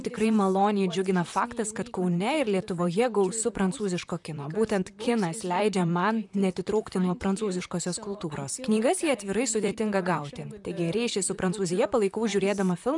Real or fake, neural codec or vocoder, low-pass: fake; vocoder, 44.1 kHz, 128 mel bands, Pupu-Vocoder; 10.8 kHz